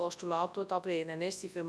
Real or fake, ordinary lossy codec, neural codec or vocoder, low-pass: fake; none; codec, 24 kHz, 0.9 kbps, WavTokenizer, large speech release; none